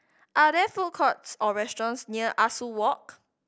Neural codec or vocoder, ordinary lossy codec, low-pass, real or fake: none; none; none; real